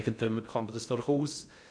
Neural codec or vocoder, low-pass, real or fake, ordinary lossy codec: codec, 16 kHz in and 24 kHz out, 0.6 kbps, FocalCodec, streaming, 2048 codes; 9.9 kHz; fake; none